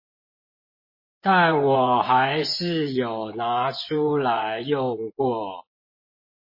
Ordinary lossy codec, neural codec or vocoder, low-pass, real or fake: MP3, 24 kbps; vocoder, 24 kHz, 100 mel bands, Vocos; 5.4 kHz; fake